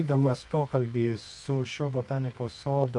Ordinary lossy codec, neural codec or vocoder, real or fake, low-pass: MP3, 64 kbps; codec, 24 kHz, 0.9 kbps, WavTokenizer, medium music audio release; fake; 10.8 kHz